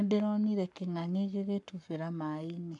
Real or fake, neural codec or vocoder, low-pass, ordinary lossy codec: fake; codec, 44.1 kHz, 7.8 kbps, Pupu-Codec; 10.8 kHz; none